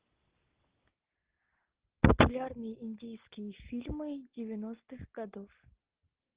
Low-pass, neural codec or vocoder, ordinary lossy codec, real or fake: 3.6 kHz; none; Opus, 16 kbps; real